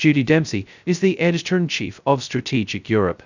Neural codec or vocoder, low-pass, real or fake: codec, 16 kHz, 0.2 kbps, FocalCodec; 7.2 kHz; fake